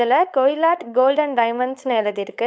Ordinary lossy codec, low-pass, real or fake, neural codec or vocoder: none; none; fake; codec, 16 kHz, 4.8 kbps, FACodec